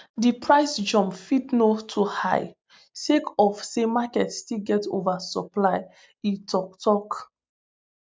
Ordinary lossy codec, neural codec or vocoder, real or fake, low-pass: none; none; real; none